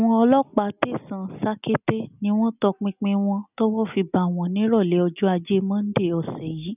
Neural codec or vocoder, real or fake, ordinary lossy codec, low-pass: none; real; none; 3.6 kHz